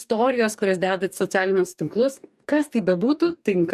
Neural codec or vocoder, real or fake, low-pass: codec, 44.1 kHz, 2.6 kbps, DAC; fake; 14.4 kHz